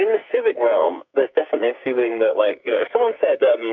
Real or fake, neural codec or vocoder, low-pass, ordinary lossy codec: fake; codec, 44.1 kHz, 2.6 kbps, SNAC; 7.2 kHz; MP3, 64 kbps